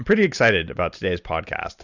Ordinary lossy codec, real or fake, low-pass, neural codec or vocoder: Opus, 64 kbps; fake; 7.2 kHz; vocoder, 44.1 kHz, 128 mel bands every 256 samples, BigVGAN v2